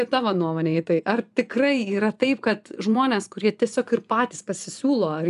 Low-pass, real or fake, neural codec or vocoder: 10.8 kHz; fake; vocoder, 24 kHz, 100 mel bands, Vocos